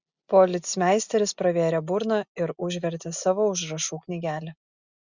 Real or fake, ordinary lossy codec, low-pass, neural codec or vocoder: real; Opus, 64 kbps; 7.2 kHz; none